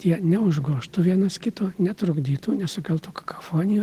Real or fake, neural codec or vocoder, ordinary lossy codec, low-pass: real; none; Opus, 16 kbps; 14.4 kHz